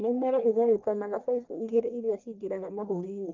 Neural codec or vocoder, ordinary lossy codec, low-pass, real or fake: codec, 44.1 kHz, 1.7 kbps, Pupu-Codec; Opus, 32 kbps; 7.2 kHz; fake